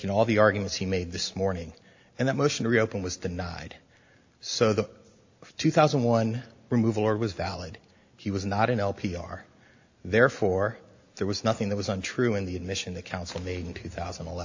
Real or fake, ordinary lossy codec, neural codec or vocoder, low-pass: real; AAC, 48 kbps; none; 7.2 kHz